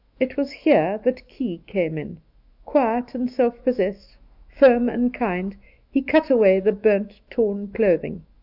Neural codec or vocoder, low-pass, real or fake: autoencoder, 48 kHz, 128 numbers a frame, DAC-VAE, trained on Japanese speech; 5.4 kHz; fake